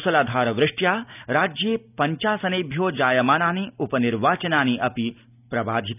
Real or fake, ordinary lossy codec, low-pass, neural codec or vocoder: real; none; 3.6 kHz; none